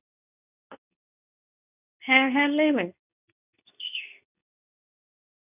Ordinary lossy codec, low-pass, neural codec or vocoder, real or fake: none; 3.6 kHz; codec, 24 kHz, 0.9 kbps, WavTokenizer, medium speech release version 2; fake